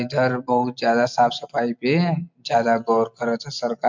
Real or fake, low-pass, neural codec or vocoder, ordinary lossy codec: real; 7.2 kHz; none; MP3, 64 kbps